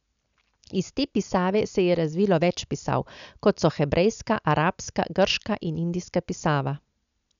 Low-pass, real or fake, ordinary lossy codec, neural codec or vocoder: 7.2 kHz; real; none; none